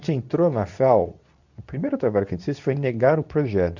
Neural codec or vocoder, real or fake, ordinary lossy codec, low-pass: codec, 24 kHz, 0.9 kbps, WavTokenizer, medium speech release version 2; fake; none; 7.2 kHz